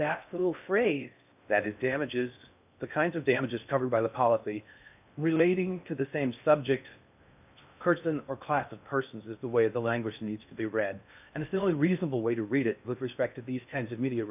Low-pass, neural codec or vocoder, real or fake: 3.6 kHz; codec, 16 kHz in and 24 kHz out, 0.6 kbps, FocalCodec, streaming, 4096 codes; fake